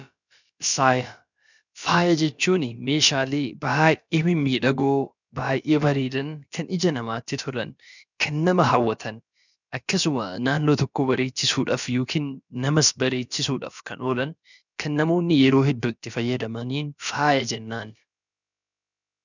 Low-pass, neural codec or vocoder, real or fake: 7.2 kHz; codec, 16 kHz, about 1 kbps, DyCAST, with the encoder's durations; fake